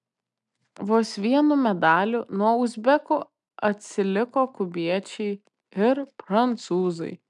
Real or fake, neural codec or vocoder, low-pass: real; none; 10.8 kHz